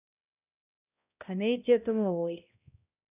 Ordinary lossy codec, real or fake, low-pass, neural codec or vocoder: none; fake; 3.6 kHz; codec, 16 kHz, 0.5 kbps, X-Codec, HuBERT features, trained on balanced general audio